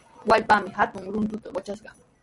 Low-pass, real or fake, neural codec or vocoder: 10.8 kHz; real; none